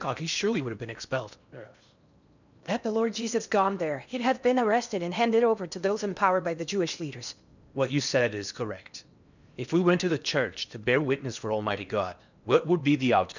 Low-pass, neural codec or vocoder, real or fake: 7.2 kHz; codec, 16 kHz in and 24 kHz out, 0.8 kbps, FocalCodec, streaming, 65536 codes; fake